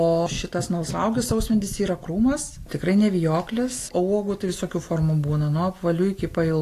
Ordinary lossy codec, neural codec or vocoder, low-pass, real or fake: AAC, 48 kbps; none; 14.4 kHz; real